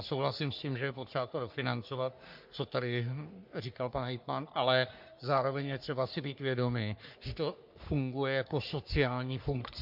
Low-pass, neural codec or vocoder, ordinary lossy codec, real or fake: 5.4 kHz; codec, 44.1 kHz, 3.4 kbps, Pupu-Codec; MP3, 48 kbps; fake